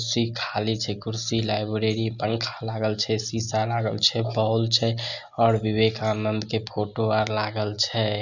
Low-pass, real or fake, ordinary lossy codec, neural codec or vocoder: 7.2 kHz; real; none; none